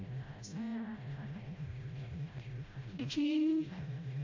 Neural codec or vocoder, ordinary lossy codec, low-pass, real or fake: codec, 16 kHz, 0.5 kbps, FreqCodec, smaller model; MP3, 48 kbps; 7.2 kHz; fake